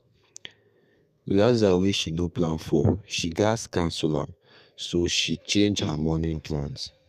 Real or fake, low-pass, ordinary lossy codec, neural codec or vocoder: fake; 14.4 kHz; none; codec, 32 kHz, 1.9 kbps, SNAC